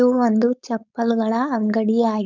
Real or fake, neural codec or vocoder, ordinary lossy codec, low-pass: fake; codec, 16 kHz, 4.8 kbps, FACodec; none; 7.2 kHz